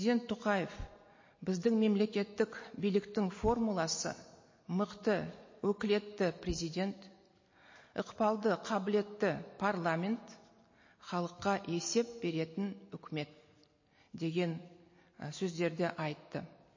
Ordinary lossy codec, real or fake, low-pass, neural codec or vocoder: MP3, 32 kbps; real; 7.2 kHz; none